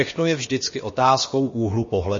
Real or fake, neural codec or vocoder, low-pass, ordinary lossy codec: fake; codec, 16 kHz, about 1 kbps, DyCAST, with the encoder's durations; 7.2 kHz; MP3, 32 kbps